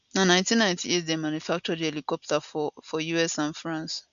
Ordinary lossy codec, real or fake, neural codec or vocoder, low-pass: none; real; none; 7.2 kHz